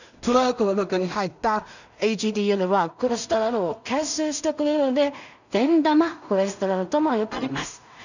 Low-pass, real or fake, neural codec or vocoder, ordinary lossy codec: 7.2 kHz; fake; codec, 16 kHz in and 24 kHz out, 0.4 kbps, LongCat-Audio-Codec, two codebook decoder; none